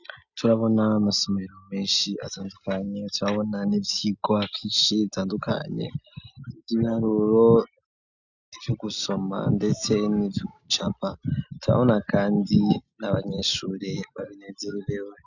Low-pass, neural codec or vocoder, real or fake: 7.2 kHz; none; real